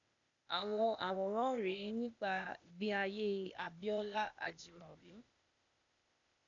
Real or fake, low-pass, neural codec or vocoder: fake; 7.2 kHz; codec, 16 kHz, 0.8 kbps, ZipCodec